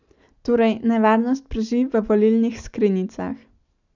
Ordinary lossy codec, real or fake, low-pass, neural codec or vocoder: none; real; 7.2 kHz; none